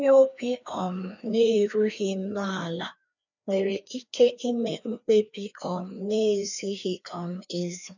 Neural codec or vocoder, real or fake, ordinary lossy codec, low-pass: codec, 16 kHz, 2 kbps, FreqCodec, larger model; fake; none; 7.2 kHz